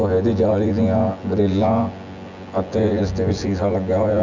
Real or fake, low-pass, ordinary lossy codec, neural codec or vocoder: fake; 7.2 kHz; none; vocoder, 24 kHz, 100 mel bands, Vocos